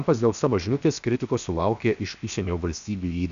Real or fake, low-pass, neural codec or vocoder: fake; 7.2 kHz; codec, 16 kHz, 0.7 kbps, FocalCodec